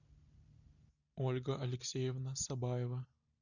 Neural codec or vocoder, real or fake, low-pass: none; real; 7.2 kHz